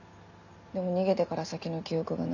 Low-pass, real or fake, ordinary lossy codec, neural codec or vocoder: 7.2 kHz; real; none; none